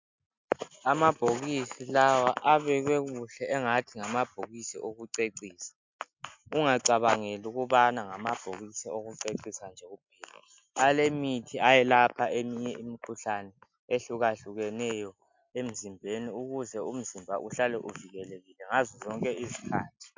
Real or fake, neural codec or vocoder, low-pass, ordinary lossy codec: real; none; 7.2 kHz; MP3, 64 kbps